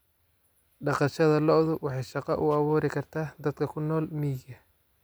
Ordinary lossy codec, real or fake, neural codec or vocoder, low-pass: none; real; none; none